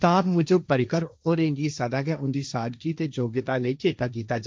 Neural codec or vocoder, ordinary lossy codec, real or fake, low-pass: codec, 16 kHz, 1.1 kbps, Voila-Tokenizer; none; fake; none